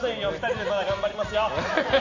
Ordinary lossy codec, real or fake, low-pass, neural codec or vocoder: none; real; 7.2 kHz; none